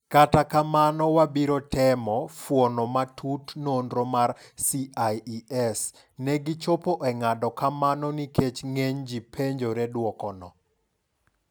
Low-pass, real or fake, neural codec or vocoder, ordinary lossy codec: none; real; none; none